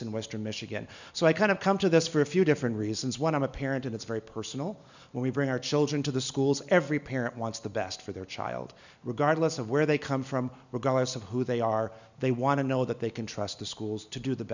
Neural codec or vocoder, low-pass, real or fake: none; 7.2 kHz; real